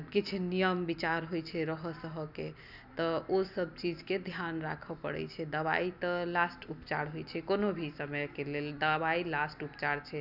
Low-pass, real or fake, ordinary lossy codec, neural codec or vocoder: 5.4 kHz; real; none; none